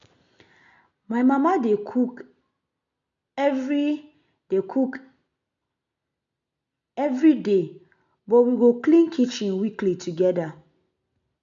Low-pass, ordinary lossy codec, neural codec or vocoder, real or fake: 7.2 kHz; MP3, 64 kbps; none; real